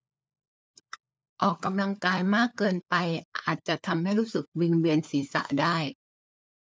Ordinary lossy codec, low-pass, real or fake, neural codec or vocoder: none; none; fake; codec, 16 kHz, 4 kbps, FunCodec, trained on LibriTTS, 50 frames a second